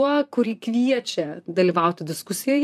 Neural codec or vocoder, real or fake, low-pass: vocoder, 44.1 kHz, 128 mel bands, Pupu-Vocoder; fake; 14.4 kHz